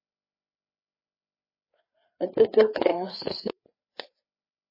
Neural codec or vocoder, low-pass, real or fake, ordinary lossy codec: codec, 16 kHz, 4 kbps, FreqCodec, larger model; 5.4 kHz; fake; MP3, 24 kbps